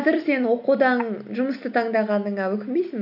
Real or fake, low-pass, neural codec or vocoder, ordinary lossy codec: real; 5.4 kHz; none; none